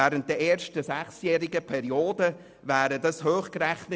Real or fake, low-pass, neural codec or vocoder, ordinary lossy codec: real; none; none; none